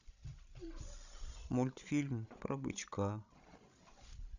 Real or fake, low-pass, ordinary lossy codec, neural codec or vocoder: fake; 7.2 kHz; none; codec, 16 kHz, 16 kbps, FreqCodec, larger model